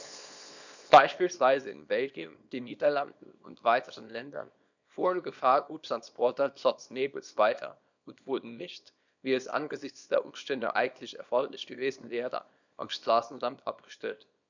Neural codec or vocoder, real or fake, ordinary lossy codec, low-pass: codec, 24 kHz, 0.9 kbps, WavTokenizer, small release; fake; none; 7.2 kHz